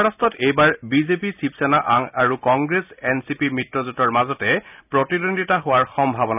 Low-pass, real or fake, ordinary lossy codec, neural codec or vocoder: 3.6 kHz; real; none; none